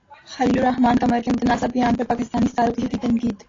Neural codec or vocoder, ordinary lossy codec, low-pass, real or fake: none; AAC, 32 kbps; 7.2 kHz; real